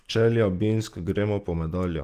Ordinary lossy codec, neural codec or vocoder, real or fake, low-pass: Opus, 24 kbps; none; real; 14.4 kHz